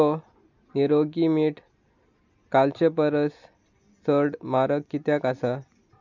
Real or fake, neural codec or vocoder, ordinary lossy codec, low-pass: real; none; none; 7.2 kHz